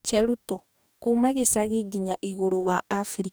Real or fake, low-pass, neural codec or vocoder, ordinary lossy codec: fake; none; codec, 44.1 kHz, 2.6 kbps, DAC; none